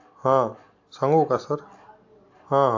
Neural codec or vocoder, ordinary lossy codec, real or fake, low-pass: none; AAC, 48 kbps; real; 7.2 kHz